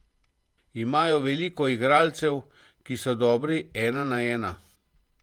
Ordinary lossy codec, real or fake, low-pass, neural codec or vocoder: Opus, 32 kbps; fake; 19.8 kHz; vocoder, 48 kHz, 128 mel bands, Vocos